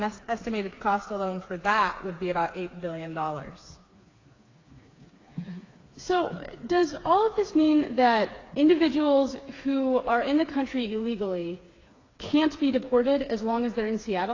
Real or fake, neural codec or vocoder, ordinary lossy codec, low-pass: fake; codec, 16 kHz, 4 kbps, FreqCodec, smaller model; AAC, 32 kbps; 7.2 kHz